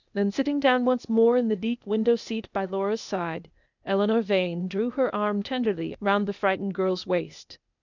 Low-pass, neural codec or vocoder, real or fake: 7.2 kHz; codec, 16 kHz, 0.8 kbps, ZipCodec; fake